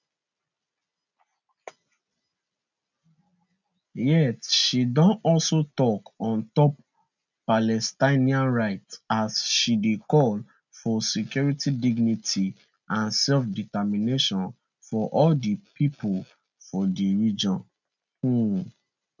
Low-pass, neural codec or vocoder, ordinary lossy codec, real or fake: 7.2 kHz; none; none; real